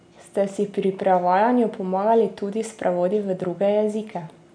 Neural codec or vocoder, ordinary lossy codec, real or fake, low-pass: none; MP3, 96 kbps; real; 9.9 kHz